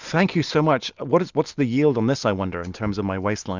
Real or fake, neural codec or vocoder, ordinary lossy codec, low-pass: real; none; Opus, 64 kbps; 7.2 kHz